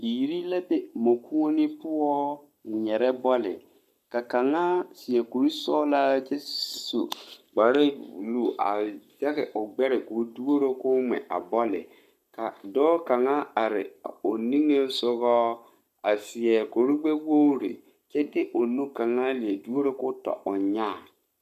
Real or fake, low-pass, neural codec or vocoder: fake; 14.4 kHz; codec, 44.1 kHz, 7.8 kbps, Pupu-Codec